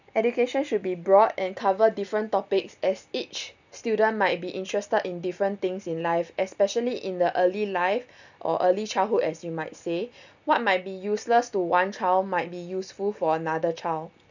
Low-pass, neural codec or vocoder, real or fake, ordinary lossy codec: 7.2 kHz; none; real; none